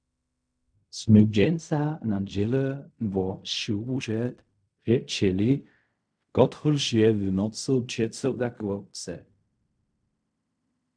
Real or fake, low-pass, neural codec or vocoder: fake; 9.9 kHz; codec, 16 kHz in and 24 kHz out, 0.4 kbps, LongCat-Audio-Codec, fine tuned four codebook decoder